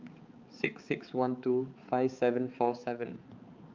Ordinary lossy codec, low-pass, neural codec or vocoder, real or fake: Opus, 24 kbps; 7.2 kHz; codec, 16 kHz, 4 kbps, X-Codec, HuBERT features, trained on balanced general audio; fake